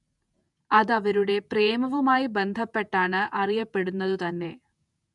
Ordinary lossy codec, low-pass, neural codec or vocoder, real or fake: none; 10.8 kHz; vocoder, 48 kHz, 128 mel bands, Vocos; fake